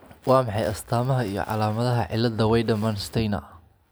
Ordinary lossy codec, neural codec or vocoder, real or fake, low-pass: none; none; real; none